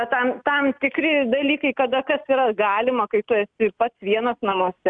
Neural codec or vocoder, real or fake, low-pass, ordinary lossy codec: none; real; 9.9 kHz; MP3, 96 kbps